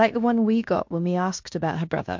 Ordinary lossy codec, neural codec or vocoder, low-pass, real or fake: MP3, 48 kbps; codec, 16 kHz in and 24 kHz out, 0.9 kbps, LongCat-Audio-Codec, fine tuned four codebook decoder; 7.2 kHz; fake